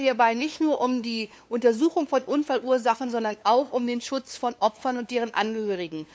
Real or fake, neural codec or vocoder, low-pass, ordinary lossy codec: fake; codec, 16 kHz, 2 kbps, FunCodec, trained on LibriTTS, 25 frames a second; none; none